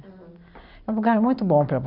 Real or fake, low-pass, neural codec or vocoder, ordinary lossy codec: real; 5.4 kHz; none; none